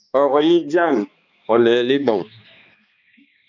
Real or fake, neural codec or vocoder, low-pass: fake; codec, 16 kHz, 2 kbps, X-Codec, HuBERT features, trained on balanced general audio; 7.2 kHz